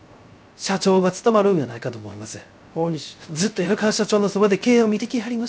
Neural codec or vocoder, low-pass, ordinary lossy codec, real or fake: codec, 16 kHz, 0.3 kbps, FocalCodec; none; none; fake